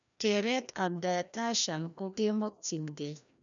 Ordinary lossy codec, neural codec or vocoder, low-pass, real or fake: none; codec, 16 kHz, 1 kbps, FreqCodec, larger model; 7.2 kHz; fake